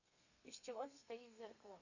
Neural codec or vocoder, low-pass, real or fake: codec, 32 kHz, 1.9 kbps, SNAC; 7.2 kHz; fake